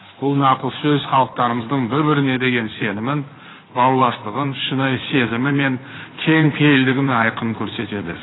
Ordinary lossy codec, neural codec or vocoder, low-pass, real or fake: AAC, 16 kbps; codec, 16 kHz in and 24 kHz out, 2.2 kbps, FireRedTTS-2 codec; 7.2 kHz; fake